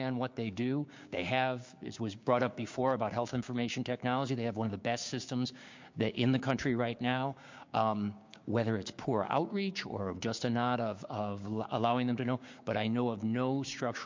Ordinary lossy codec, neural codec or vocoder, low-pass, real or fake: MP3, 48 kbps; codec, 16 kHz, 6 kbps, DAC; 7.2 kHz; fake